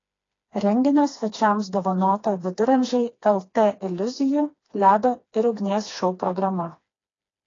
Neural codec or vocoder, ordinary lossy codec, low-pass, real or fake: codec, 16 kHz, 2 kbps, FreqCodec, smaller model; AAC, 32 kbps; 7.2 kHz; fake